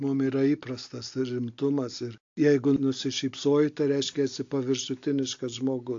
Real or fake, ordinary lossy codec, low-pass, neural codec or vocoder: real; AAC, 48 kbps; 7.2 kHz; none